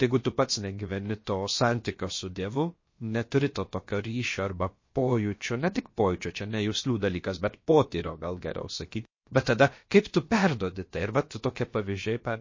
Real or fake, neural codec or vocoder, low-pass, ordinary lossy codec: fake; codec, 16 kHz, about 1 kbps, DyCAST, with the encoder's durations; 7.2 kHz; MP3, 32 kbps